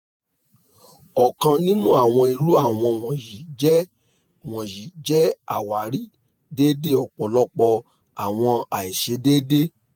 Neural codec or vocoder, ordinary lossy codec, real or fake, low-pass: vocoder, 48 kHz, 128 mel bands, Vocos; none; fake; none